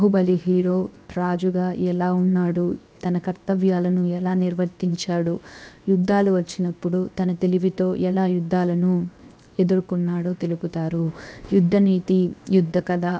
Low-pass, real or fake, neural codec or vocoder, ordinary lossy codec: none; fake; codec, 16 kHz, 0.7 kbps, FocalCodec; none